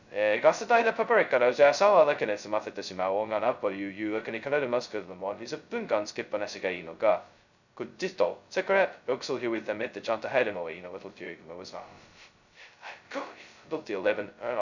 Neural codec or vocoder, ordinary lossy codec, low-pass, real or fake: codec, 16 kHz, 0.2 kbps, FocalCodec; none; 7.2 kHz; fake